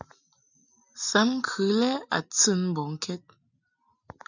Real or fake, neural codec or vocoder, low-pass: real; none; 7.2 kHz